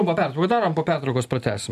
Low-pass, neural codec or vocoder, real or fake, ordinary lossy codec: 14.4 kHz; none; real; AAC, 96 kbps